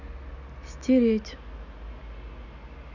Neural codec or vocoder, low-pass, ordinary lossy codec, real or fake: none; 7.2 kHz; none; real